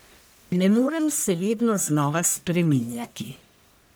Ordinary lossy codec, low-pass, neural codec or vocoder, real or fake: none; none; codec, 44.1 kHz, 1.7 kbps, Pupu-Codec; fake